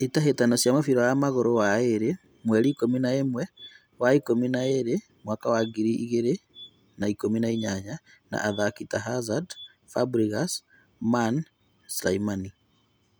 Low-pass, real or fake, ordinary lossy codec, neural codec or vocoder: none; real; none; none